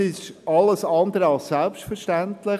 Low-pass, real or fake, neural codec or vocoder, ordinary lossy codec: 14.4 kHz; real; none; none